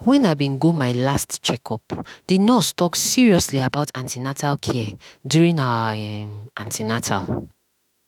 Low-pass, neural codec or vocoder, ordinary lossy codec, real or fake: 19.8 kHz; autoencoder, 48 kHz, 32 numbers a frame, DAC-VAE, trained on Japanese speech; none; fake